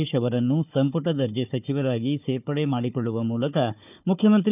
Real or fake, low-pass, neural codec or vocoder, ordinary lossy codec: fake; 3.6 kHz; codec, 16 kHz, 4 kbps, FunCodec, trained on Chinese and English, 50 frames a second; none